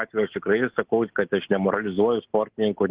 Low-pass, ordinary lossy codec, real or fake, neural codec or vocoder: 3.6 kHz; Opus, 16 kbps; real; none